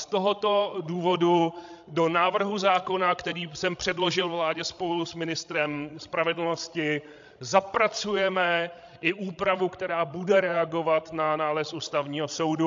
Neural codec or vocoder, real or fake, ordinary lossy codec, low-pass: codec, 16 kHz, 16 kbps, FreqCodec, larger model; fake; AAC, 64 kbps; 7.2 kHz